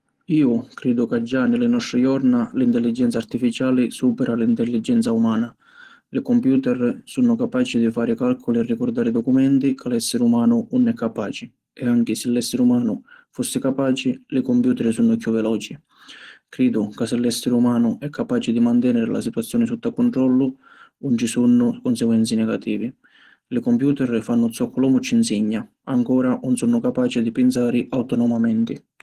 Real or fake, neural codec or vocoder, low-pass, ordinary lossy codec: real; none; 14.4 kHz; Opus, 16 kbps